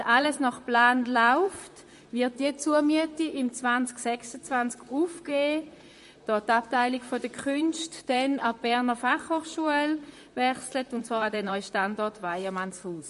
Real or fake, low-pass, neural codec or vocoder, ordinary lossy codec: fake; 14.4 kHz; vocoder, 44.1 kHz, 128 mel bands, Pupu-Vocoder; MP3, 48 kbps